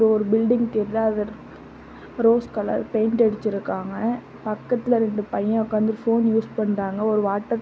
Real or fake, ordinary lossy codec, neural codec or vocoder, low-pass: real; none; none; none